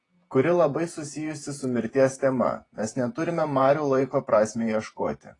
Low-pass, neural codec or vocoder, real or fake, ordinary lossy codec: 10.8 kHz; vocoder, 48 kHz, 128 mel bands, Vocos; fake; AAC, 32 kbps